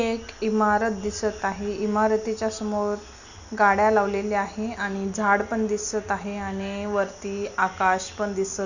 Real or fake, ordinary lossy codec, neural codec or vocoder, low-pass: real; none; none; 7.2 kHz